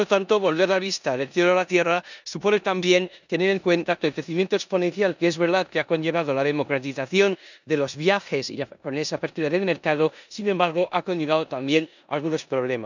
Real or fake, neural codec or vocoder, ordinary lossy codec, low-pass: fake; codec, 16 kHz in and 24 kHz out, 0.9 kbps, LongCat-Audio-Codec, four codebook decoder; none; 7.2 kHz